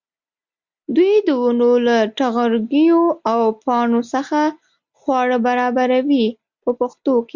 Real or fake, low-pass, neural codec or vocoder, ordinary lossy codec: real; 7.2 kHz; none; Opus, 64 kbps